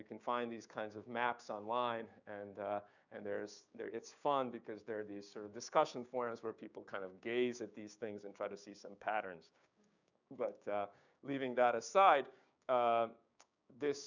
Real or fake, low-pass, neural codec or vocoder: fake; 7.2 kHz; codec, 16 kHz, 6 kbps, DAC